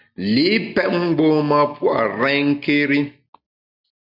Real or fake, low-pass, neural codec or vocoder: real; 5.4 kHz; none